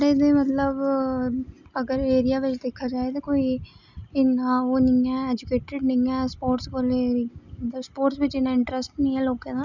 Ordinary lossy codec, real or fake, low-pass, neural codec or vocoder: none; real; 7.2 kHz; none